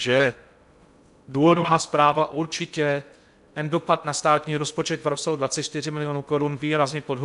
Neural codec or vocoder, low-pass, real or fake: codec, 16 kHz in and 24 kHz out, 0.6 kbps, FocalCodec, streaming, 2048 codes; 10.8 kHz; fake